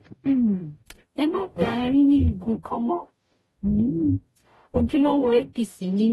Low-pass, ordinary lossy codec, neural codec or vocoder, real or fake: 19.8 kHz; AAC, 32 kbps; codec, 44.1 kHz, 0.9 kbps, DAC; fake